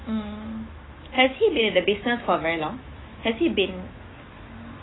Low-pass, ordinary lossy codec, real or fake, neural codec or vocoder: 7.2 kHz; AAC, 16 kbps; fake; autoencoder, 48 kHz, 128 numbers a frame, DAC-VAE, trained on Japanese speech